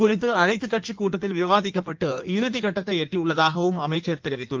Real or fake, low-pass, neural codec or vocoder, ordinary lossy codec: fake; 7.2 kHz; codec, 16 kHz in and 24 kHz out, 1.1 kbps, FireRedTTS-2 codec; Opus, 32 kbps